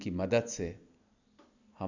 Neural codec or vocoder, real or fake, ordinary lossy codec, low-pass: none; real; none; 7.2 kHz